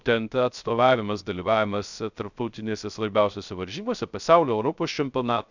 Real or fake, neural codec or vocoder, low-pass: fake; codec, 16 kHz, 0.3 kbps, FocalCodec; 7.2 kHz